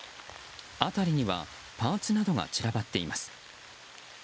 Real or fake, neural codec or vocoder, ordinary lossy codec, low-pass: real; none; none; none